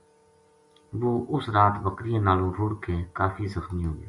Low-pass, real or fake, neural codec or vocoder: 10.8 kHz; real; none